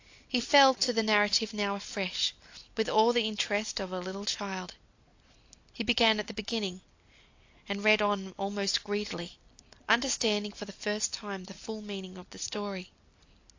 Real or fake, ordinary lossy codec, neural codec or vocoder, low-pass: real; AAC, 48 kbps; none; 7.2 kHz